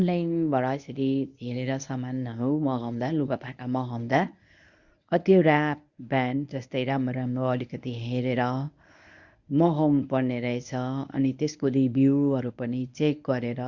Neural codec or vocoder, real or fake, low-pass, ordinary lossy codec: codec, 24 kHz, 0.9 kbps, WavTokenizer, medium speech release version 1; fake; 7.2 kHz; Opus, 64 kbps